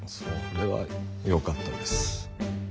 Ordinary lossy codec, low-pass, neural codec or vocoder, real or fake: none; none; none; real